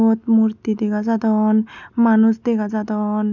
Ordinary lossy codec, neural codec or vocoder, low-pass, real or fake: none; none; 7.2 kHz; real